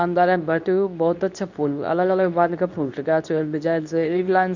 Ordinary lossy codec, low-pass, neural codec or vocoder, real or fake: none; 7.2 kHz; codec, 24 kHz, 0.9 kbps, WavTokenizer, medium speech release version 2; fake